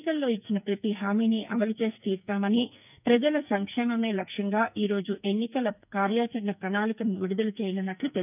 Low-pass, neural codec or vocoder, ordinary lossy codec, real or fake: 3.6 kHz; codec, 44.1 kHz, 2.6 kbps, SNAC; none; fake